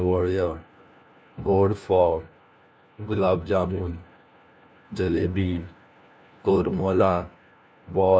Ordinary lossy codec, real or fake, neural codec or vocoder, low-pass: none; fake; codec, 16 kHz, 1 kbps, FunCodec, trained on LibriTTS, 50 frames a second; none